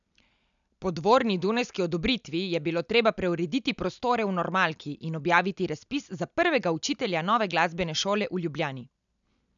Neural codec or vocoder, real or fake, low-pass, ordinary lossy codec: none; real; 7.2 kHz; none